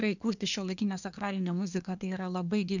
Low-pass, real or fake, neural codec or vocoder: 7.2 kHz; fake; codec, 24 kHz, 1 kbps, SNAC